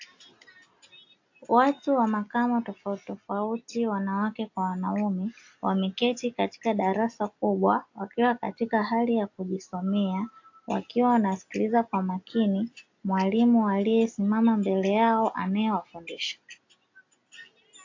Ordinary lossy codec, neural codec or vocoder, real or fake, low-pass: AAC, 48 kbps; none; real; 7.2 kHz